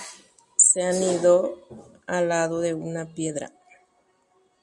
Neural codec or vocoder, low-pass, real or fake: none; 10.8 kHz; real